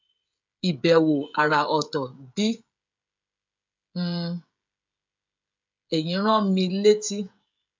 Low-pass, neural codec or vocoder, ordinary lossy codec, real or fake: 7.2 kHz; codec, 16 kHz, 16 kbps, FreqCodec, smaller model; MP3, 64 kbps; fake